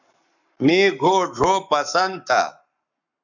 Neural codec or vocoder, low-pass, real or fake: codec, 44.1 kHz, 7.8 kbps, Pupu-Codec; 7.2 kHz; fake